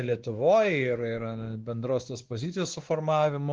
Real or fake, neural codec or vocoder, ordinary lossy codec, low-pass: fake; codec, 16 kHz, 2 kbps, X-Codec, WavLM features, trained on Multilingual LibriSpeech; Opus, 16 kbps; 7.2 kHz